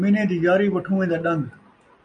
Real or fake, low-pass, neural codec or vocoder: real; 9.9 kHz; none